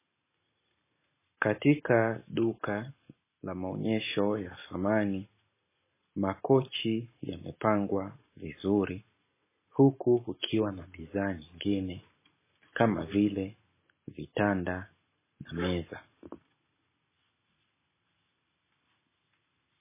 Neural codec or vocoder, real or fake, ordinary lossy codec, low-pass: none; real; MP3, 16 kbps; 3.6 kHz